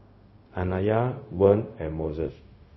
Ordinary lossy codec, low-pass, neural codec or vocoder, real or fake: MP3, 24 kbps; 7.2 kHz; codec, 16 kHz, 0.4 kbps, LongCat-Audio-Codec; fake